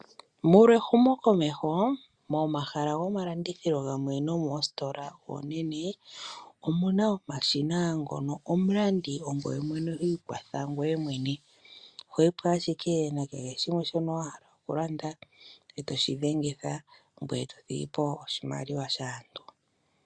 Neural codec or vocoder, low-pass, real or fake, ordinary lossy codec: none; 9.9 kHz; real; Opus, 64 kbps